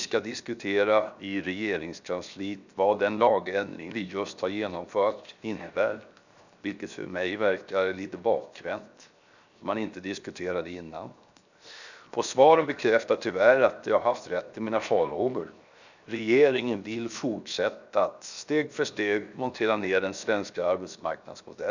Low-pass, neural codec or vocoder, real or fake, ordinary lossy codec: 7.2 kHz; codec, 16 kHz, 0.7 kbps, FocalCodec; fake; none